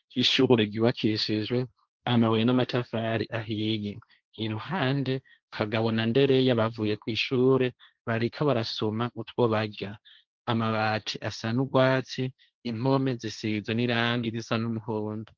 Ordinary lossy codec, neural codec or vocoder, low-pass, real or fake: Opus, 32 kbps; codec, 16 kHz, 1.1 kbps, Voila-Tokenizer; 7.2 kHz; fake